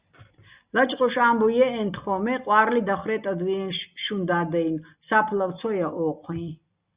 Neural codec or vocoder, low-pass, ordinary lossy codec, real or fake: none; 3.6 kHz; Opus, 64 kbps; real